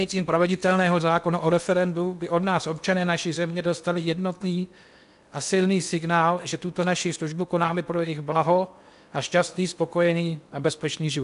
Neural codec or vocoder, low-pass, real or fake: codec, 16 kHz in and 24 kHz out, 0.8 kbps, FocalCodec, streaming, 65536 codes; 10.8 kHz; fake